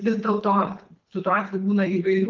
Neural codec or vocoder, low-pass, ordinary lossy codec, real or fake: codec, 24 kHz, 3 kbps, HILCodec; 7.2 kHz; Opus, 32 kbps; fake